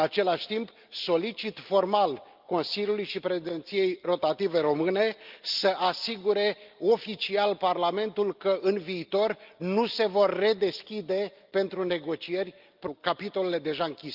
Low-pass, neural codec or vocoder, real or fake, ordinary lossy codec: 5.4 kHz; none; real; Opus, 24 kbps